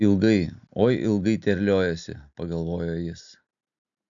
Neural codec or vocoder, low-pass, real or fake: none; 7.2 kHz; real